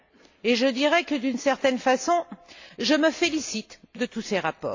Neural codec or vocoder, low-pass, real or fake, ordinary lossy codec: none; 7.2 kHz; real; AAC, 48 kbps